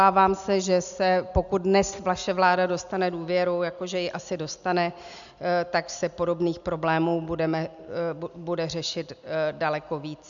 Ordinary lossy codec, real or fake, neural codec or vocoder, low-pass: MP3, 96 kbps; real; none; 7.2 kHz